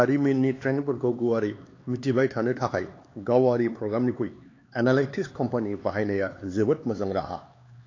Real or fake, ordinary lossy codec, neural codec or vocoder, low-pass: fake; AAC, 32 kbps; codec, 16 kHz, 4 kbps, X-Codec, HuBERT features, trained on LibriSpeech; 7.2 kHz